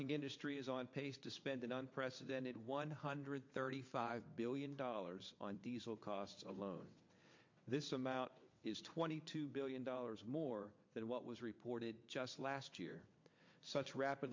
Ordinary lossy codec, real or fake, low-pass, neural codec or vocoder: MP3, 48 kbps; fake; 7.2 kHz; vocoder, 22.05 kHz, 80 mel bands, WaveNeXt